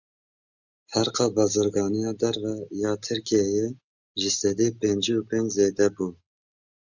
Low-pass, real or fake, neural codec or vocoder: 7.2 kHz; real; none